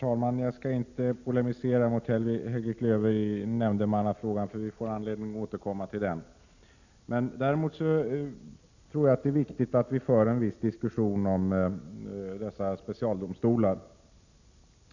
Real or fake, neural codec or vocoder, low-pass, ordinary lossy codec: real; none; 7.2 kHz; none